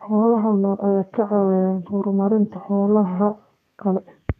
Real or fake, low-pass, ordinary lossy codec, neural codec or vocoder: fake; 14.4 kHz; none; codec, 32 kHz, 1.9 kbps, SNAC